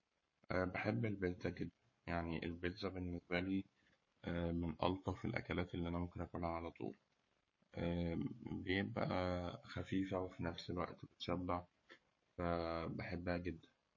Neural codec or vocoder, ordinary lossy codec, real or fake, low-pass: codec, 44.1 kHz, 7.8 kbps, Pupu-Codec; MP3, 32 kbps; fake; 7.2 kHz